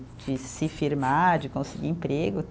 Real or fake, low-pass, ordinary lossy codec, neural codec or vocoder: real; none; none; none